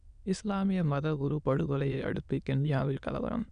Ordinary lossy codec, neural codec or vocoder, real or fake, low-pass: none; autoencoder, 22.05 kHz, a latent of 192 numbers a frame, VITS, trained on many speakers; fake; 9.9 kHz